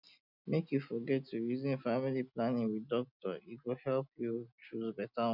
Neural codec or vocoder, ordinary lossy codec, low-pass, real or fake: none; none; 5.4 kHz; real